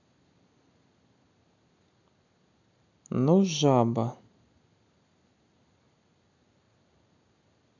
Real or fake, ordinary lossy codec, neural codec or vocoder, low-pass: real; none; none; 7.2 kHz